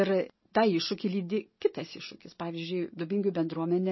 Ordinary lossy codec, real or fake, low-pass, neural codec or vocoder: MP3, 24 kbps; real; 7.2 kHz; none